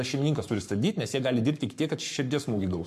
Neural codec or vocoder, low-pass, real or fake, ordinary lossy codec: codec, 44.1 kHz, 7.8 kbps, Pupu-Codec; 14.4 kHz; fake; MP3, 96 kbps